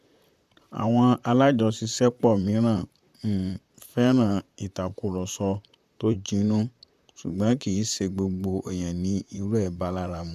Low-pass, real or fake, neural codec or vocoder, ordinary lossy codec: 14.4 kHz; fake; vocoder, 44.1 kHz, 128 mel bands, Pupu-Vocoder; none